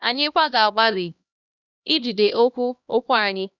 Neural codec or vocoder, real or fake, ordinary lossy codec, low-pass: codec, 16 kHz, 1 kbps, X-Codec, HuBERT features, trained on LibriSpeech; fake; Opus, 64 kbps; 7.2 kHz